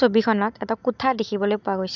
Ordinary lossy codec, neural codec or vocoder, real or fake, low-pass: none; none; real; 7.2 kHz